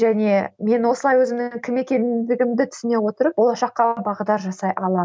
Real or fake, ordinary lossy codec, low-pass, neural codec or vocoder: real; none; none; none